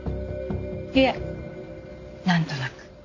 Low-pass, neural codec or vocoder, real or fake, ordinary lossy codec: 7.2 kHz; none; real; none